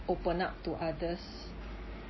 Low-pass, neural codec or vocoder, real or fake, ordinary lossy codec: 7.2 kHz; none; real; MP3, 24 kbps